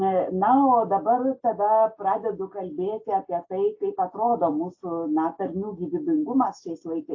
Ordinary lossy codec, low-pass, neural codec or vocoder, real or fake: AAC, 48 kbps; 7.2 kHz; none; real